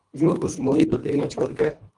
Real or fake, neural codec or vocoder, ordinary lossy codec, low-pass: fake; codec, 24 kHz, 1.5 kbps, HILCodec; Opus, 32 kbps; 10.8 kHz